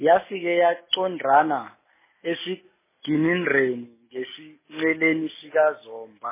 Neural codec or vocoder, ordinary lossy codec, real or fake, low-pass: none; MP3, 16 kbps; real; 3.6 kHz